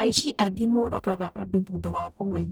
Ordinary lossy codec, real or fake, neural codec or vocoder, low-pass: none; fake; codec, 44.1 kHz, 0.9 kbps, DAC; none